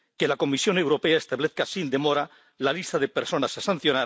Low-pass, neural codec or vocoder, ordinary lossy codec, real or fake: none; none; none; real